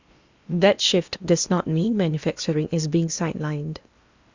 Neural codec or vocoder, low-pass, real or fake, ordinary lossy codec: codec, 16 kHz in and 24 kHz out, 0.8 kbps, FocalCodec, streaming, 65536 codes; 7.2 kHz; fake; Opus, 64 kbps